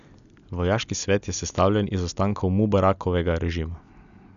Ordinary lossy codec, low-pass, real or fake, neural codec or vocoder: none; 7.2 kHz; real; none